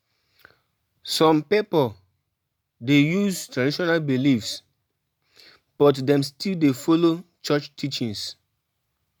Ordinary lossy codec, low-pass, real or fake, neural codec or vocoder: none; none; real; none